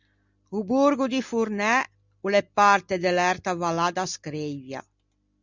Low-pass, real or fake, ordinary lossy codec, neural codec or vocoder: 7.2 kHz; real; Opus, 64 kbps; none